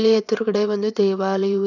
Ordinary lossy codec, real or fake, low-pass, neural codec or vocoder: none; fake; 7.2 kHz; vocoder, 22.05 kHz, 80 mel bands, WaveNeXt